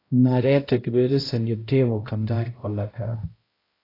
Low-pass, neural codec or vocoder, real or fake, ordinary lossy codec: 5.4 kHz; codec, 16 kHz, 0.5 kbps, X-Codec, HuBERT features, trained on balanced general audio; fake; AAC, 24 kbps